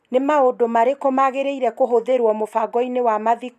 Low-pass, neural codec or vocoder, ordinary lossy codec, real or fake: 14.4 kHz; none; none; real